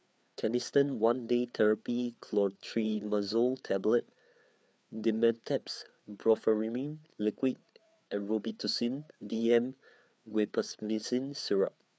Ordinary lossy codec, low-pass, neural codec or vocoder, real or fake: none; none; codec, 16 kHz, 4 kbps, FreqCodec, larger model; fake